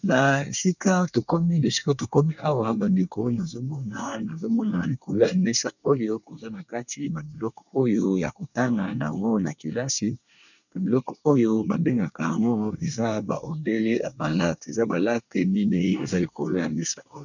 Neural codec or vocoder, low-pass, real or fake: codec, 24 kHz, 1 kbps, SNAC; 7.2 kHz; fake